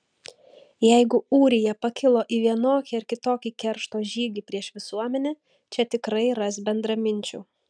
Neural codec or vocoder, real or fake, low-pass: none; real; 9.9 kHz